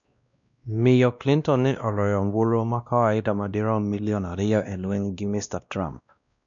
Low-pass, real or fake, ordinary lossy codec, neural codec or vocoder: 7.2 kHz; fake; MP3, 96 kbps; codec, 16 kHz, 1 kbps, X-Codec, WavLM features, trained on Multilingual LibriSpeech